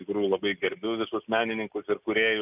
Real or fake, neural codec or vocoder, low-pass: real; none; 3.6 kHz